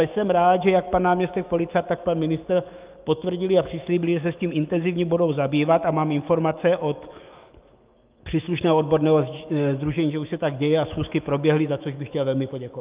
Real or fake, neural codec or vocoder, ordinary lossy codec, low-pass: fake; autoencoder, 48 kHz, 128 numbers a frame, DAC-VAE, trained on Japanese speech; Opus, 32 kbps; 3.6 kHz